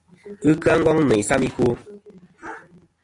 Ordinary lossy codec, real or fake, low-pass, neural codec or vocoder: AAC, 48 kbps; real; 10.8 kHz; none